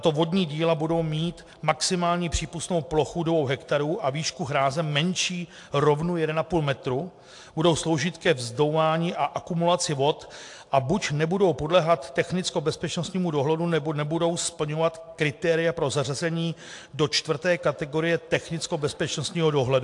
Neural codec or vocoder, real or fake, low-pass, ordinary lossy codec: none; real; 10.8 kHz; AAC, 64 kbps